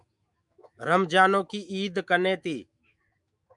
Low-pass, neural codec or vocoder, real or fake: 10.8 kHz; autoencoder, 48 kHz, 128 numbers a frame, DAC-VAE, trained on Japanese speech; fake